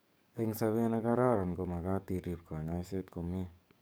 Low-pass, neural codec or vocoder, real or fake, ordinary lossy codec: none; codec, 44.1 kHz, 7.8 kbps, DAC; fake; none